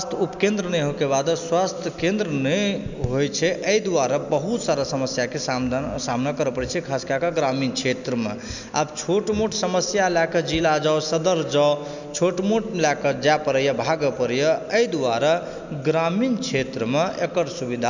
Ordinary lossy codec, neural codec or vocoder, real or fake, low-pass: none; none; real; 7.2 kHz